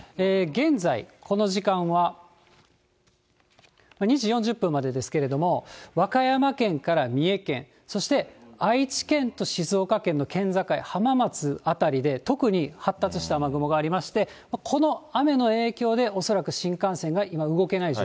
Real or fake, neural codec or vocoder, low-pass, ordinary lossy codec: real; none; none; none